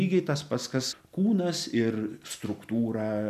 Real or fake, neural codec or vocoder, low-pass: real; none; 14.4 kHz